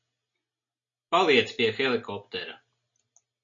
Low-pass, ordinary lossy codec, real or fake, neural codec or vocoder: 7.2 kHz; MP3, 64 kbps; real; none